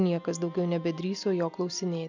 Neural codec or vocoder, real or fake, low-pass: none; real; 7.2 kHz